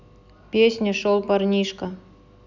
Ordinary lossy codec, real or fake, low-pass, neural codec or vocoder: none; real; 7.2 kHz; none